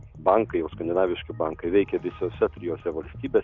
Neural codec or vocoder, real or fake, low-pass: none; real; 7.2 kHz